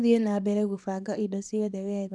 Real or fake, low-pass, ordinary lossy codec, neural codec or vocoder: fake; none; none; codec, 24 kHz, 0.9 kbps, WavTokenizer, small release